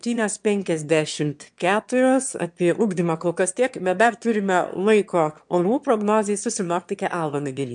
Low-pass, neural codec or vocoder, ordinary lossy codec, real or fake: 9.9 kHz; autoencoder, 22.05 kHz, a latent of 192 numbers a frame, VITS, trained on one speaker; MP3, 64 kbps; fake